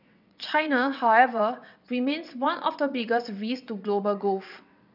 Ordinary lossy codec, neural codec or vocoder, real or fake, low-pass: none; vocoder, 22.05 kHz, 80 mel bands, WaveNeXt; fake; 5.4 kHz